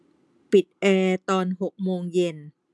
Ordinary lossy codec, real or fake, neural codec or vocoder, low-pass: none; real; none; none